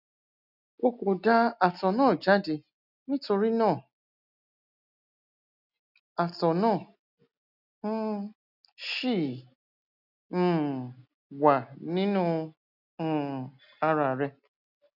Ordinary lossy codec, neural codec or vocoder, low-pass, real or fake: none; none; 5.4 kHz; real